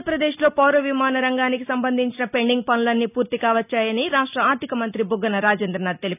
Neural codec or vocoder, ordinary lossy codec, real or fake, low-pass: none; none; real; 3.6 kHz